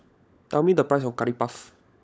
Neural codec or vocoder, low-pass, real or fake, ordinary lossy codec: codec, 16 kHz, 16 kbps, FunCodec, trained on LibriTTS, 50 frames a second; none; fake; none